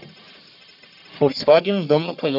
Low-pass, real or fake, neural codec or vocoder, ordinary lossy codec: 5.4 kHz; fake; codec, 44.1 kHz, 1.7 kbps, Pupu-Codec; none